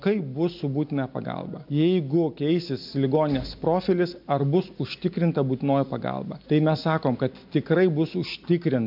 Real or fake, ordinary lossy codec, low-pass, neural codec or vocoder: real; MP3, 48 kbps; 5.4 kHz; none